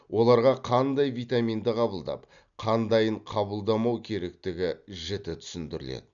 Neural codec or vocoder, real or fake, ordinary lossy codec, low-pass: none; real; none; 7.2 kHz